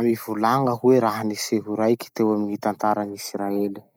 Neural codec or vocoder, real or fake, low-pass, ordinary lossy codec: none; real; none; none